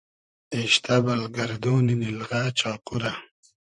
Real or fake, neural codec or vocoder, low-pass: fake; vocoder, 44.1 kHz, 128 mel bands, Pupu-Vocoder; 10.8 kHz